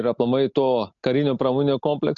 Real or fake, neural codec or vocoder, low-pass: real; none; 7.2 kHz